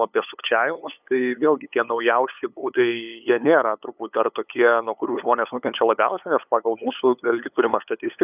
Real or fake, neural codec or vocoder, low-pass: fake; codec, 16 kHz, 8 kbps, FunCodec, trained on LibriTTS, 25 frames a second; 3.6 kHz